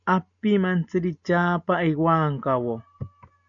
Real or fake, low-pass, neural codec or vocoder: real; 7.2 kHz; none